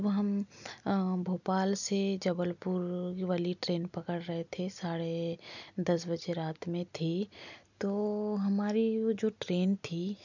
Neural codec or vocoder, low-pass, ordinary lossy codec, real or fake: none; 7.2 kHz; none; real